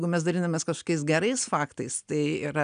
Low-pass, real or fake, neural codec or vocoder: 9.9 kHz; real; none